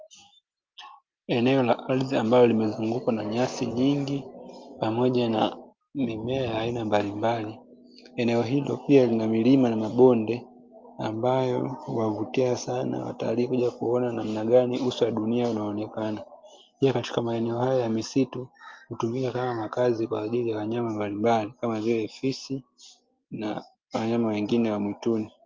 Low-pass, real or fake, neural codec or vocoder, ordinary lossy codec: 7.2 kHz; real; none; Opus, 24 kbps